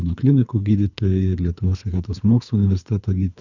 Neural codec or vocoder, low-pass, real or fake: codec, 24 kHz, 3 kbps, HILCodec; 7.2 kHz; fake